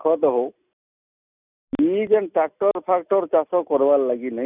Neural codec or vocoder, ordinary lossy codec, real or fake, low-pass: none; none; real; 3.6 kHz